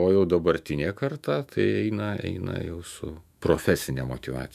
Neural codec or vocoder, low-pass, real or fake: autoencoder, 48 kHz, 128 numbers a frame, DAC-VAE, trained on Japanese speech; 14.4 kHz; fake